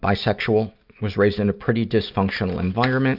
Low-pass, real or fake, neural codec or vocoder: 5.4 kHz; real; none